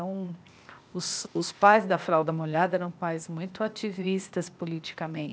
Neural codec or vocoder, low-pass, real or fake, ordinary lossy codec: codec, 16 kHz, 0.8 kbps, ZipCodec; none; fake; none